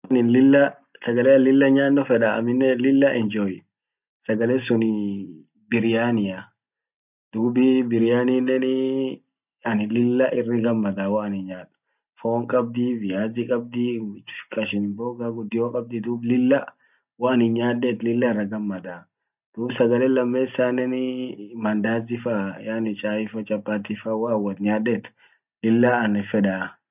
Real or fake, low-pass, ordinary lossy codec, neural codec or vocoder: real; 3.6 kHz; none; none